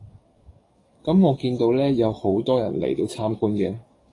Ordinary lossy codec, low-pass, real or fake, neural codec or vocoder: AAC, 32 kbps; 10.8 kHz; fake; codec, 44.1 kHz, 7.8 kbps, DAC